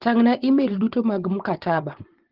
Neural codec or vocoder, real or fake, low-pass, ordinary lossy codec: vocoder, 24 kHz, 100 mel bands, Vocos; fake; 5.4 kHz; Opus, 16 kbps